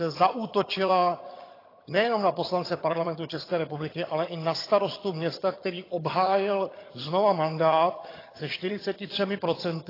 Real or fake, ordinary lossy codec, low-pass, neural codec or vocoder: fake; AAC, 24 kbps; 5.4 kHz; vocoder, 22.05 kHz, 80 mel bands, HiFi-GAN